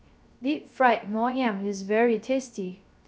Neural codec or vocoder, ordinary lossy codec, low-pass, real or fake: codec, 16 kHz, 0.3 kbps, FocalCodec; none; none; fake